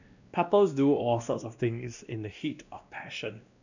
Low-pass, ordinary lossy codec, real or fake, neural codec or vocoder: 7.2 kHz; none; fake; codec, 16 kHz, 1 kbps, X-Codec, WavLM features, trained on Multilingual LibriSpeech